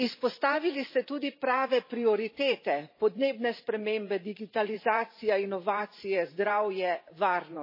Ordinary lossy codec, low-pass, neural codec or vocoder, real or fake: MP3, 24 kbps; 5.4 kHz; vocoder, 44.1 kHz, 128 mel bands every 512 samples, BigVGAN v2; fake